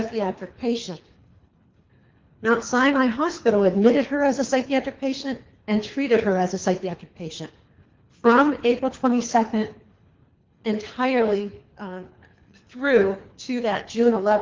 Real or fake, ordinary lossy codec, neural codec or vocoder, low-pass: fake; Opus, 32 kbps; codec, 24 kHz, 3 kbps, HILCodec; 7.2 kHz